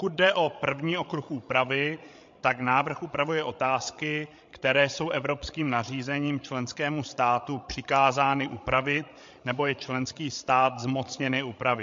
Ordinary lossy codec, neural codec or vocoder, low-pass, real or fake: MP3, 48 kbps; codec, 16 kHz, 16 kbps, FreqCodec, larger model; 7.2 kHz; fake